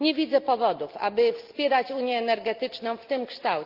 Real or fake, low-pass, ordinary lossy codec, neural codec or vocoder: real; 5.4 kHz; Opus, 32 kbps; none